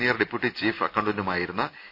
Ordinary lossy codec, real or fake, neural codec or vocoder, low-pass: none; real; none; 5.4 kHz